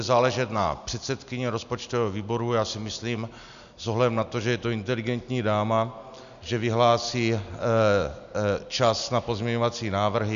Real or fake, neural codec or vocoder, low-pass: real; none; 7.2 kHz